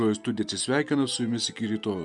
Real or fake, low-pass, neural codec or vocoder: real; 10.8 kHz; none